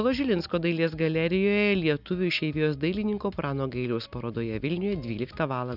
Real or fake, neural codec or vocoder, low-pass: real; none; 5.4 kHz